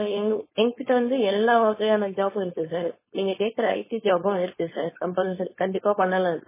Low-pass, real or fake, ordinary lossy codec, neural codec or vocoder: 3.6 kHz; fake; MP3, 16 kbps; codec, 16 kHz, 4.8 kbps, FACodec